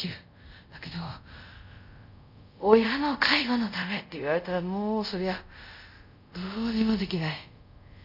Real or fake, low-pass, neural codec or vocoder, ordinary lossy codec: fake; 5.4 kHz; codec, 24 kHz, 0.5 kbps, DualCodec; none